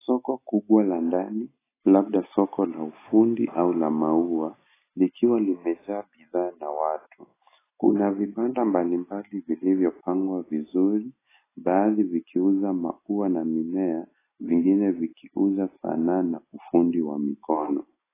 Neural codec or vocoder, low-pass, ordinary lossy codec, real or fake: none; 3.6 kHz; AAC, 16 kbps; real